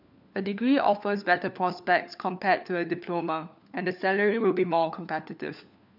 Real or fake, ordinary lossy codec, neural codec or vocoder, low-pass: fake; none; codec, 16 kHz, 4 kbps, FunCodec, trained on LibriTTS, 50 frames a second; 5.4 kHz